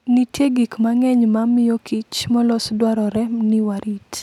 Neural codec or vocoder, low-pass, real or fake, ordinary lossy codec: none; 19.8 kHz; real; none